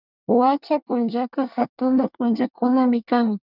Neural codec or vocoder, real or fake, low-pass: codec, 24 kHz, 1 kbps, SNAC; fake; 5.4 kHz